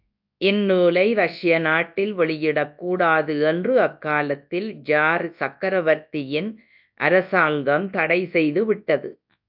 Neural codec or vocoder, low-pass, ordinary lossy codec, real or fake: codec, 24 kHz, 0.9 kbps, WavTokenizer, large speech release; 5.4 kHz; AAC, 48 kbps; fake